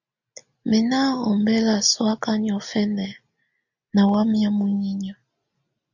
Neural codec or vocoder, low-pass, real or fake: none; 7.2 kHz; real